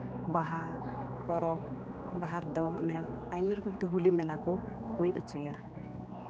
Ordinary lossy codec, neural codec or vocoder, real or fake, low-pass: none; codec, 16 kHz, 2 kbps, X-Codec, HuBERT features, trained on general audio; fake; none